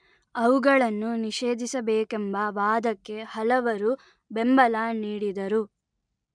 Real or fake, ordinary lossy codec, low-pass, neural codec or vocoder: real; MP3, 96 kbps; 9.9 kHz; none